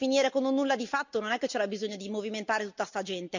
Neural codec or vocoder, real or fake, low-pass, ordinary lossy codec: none; real; 7.2 kHz; none